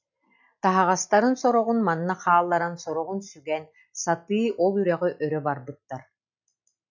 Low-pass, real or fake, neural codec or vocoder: 7.2 kHz; real; none